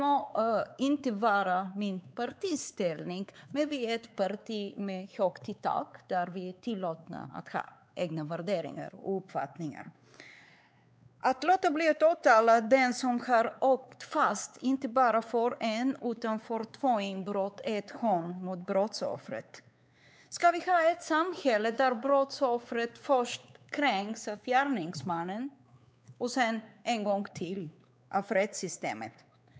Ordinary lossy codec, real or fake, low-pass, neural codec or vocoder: none; fake; none; codec, 16 kHz, 4 kbps, X-Codec, WavLM features, trained on Multilingual LibriSpeech